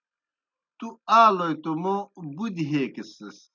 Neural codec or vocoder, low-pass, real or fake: none; 7.2 kHz; real